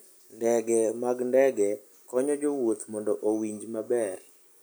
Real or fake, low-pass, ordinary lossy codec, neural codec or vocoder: real; none; none; none